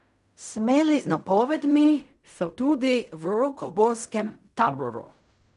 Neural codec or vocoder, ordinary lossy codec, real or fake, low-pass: codec, 16 kHz in and 24 kHz out, 0.4 kbps, LongCat-Audio-Codec, fine tuned four codebook decoder; none; fake; 10.8 kHz